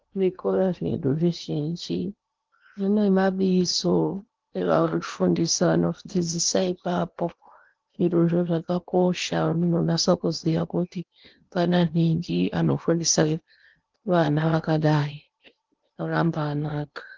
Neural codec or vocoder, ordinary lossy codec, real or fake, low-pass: codec, 16 kHz in and 24 kHz out, 0.8 kbps, FocalCodec, streaming, 65536 codes; Opus, 32 kbps; fake; 7.2 kHz